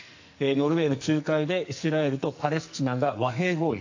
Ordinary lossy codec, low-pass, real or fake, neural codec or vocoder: AAC, 48 kbps; 7.2 kHz; fake; codec, 44.1 kHz, 2.6 kbps, SNAC